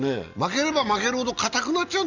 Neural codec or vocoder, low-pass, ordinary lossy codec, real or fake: none; 7.2 kHz; none; real